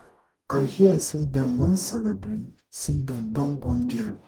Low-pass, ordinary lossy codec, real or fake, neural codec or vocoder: 19.8 kHz; Opus, 32 kbps; fake; codec, 44.1 kHz, 0.9 kbps, DAC